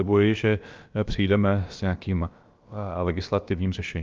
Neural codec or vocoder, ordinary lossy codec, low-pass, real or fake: codec, 16 kHz, about 1 kbps, DyCAST, with the encoder's durations; Opus, 24 kbps; 7.2 kHz; fake